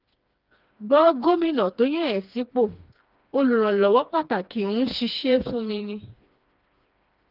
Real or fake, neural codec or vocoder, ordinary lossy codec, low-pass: fake; codec, 16 kHz, 2 kbps, FreqCodec, smaller model; Opus, 32 kbps; 5.4 kHz